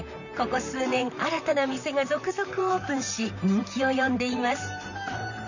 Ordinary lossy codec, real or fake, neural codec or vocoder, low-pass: none; fake; vocoder, 44.1 kHz, 128 mel bands, Pupu-Vocoder; 7.2 kHz